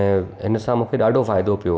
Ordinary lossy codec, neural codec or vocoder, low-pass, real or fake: none; none; none; real